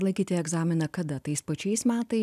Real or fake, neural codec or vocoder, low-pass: real; none; 14.4 kHz